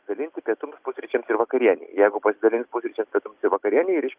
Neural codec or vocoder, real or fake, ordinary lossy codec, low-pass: none; real; Opus, 24 kbps; 3.6 kHz